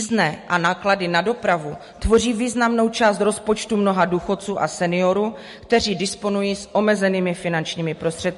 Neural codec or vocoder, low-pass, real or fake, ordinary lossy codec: none; 14.4 kHz; real; MP3, 48 kbps